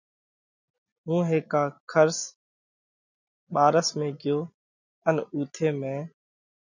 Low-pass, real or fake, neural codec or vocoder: 7.2 kHz; real; none